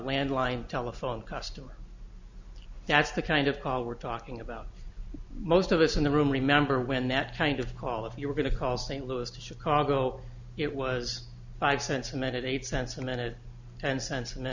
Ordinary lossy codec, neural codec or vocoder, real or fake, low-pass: Opus, 64 kbps; none; real; 7.2 kHz